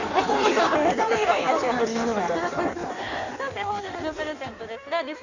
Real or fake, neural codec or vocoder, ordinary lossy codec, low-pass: fake; codec, 16 kHz in and 24 kHz out, 1.1 kbps, FireRedTTS-2 codec; none; 7.2 kHz